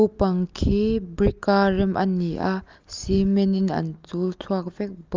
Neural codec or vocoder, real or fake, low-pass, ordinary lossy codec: none; real; 7.2 kHz; Opus, 24 kbps